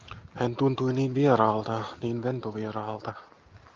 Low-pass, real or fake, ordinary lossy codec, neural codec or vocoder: 7.2 kHz; real; Opus, 24 kbps; none